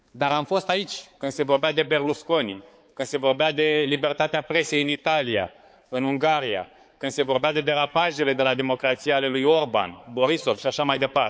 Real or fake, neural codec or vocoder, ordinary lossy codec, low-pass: fake; codec, 16 kHz, 4 kbps, X-Codec, HuBERT features, trained on balanced general audio; none; none